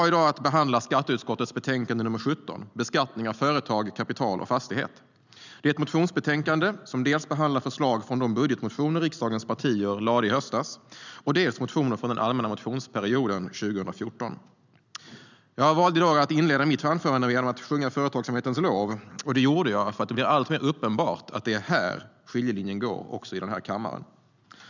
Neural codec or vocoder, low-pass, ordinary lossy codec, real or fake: none; 7.2 kHz; none; real